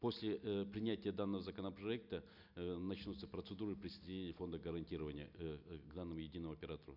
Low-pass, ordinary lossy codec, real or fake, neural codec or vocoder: 5.4 kHz; none; real; none